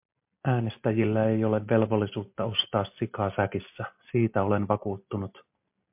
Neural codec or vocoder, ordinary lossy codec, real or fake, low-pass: none; MP3, 32 kbps; real; 3.6 kHz